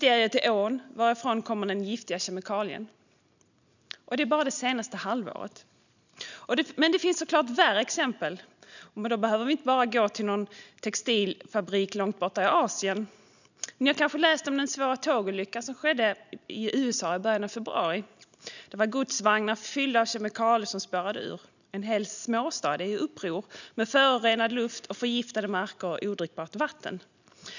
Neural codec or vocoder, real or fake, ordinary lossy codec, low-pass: none; real; none; 7.2 kHz